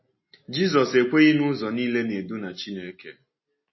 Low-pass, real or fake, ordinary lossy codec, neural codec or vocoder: 7.2 kHz; real; MP3, 24 kbps; none